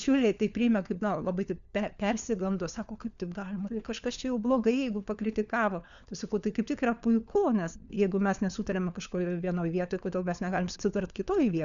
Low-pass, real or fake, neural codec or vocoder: 7.2 kHz; fake; codec, 16 kHz, 4 kbps, FunCodec, trained on LibriTTS, 50 frames a second